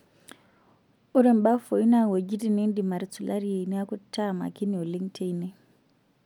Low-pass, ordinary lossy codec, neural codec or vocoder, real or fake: 19.8 kHz; none; none; real